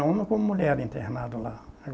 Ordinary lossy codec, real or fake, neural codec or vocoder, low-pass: none; real; none; none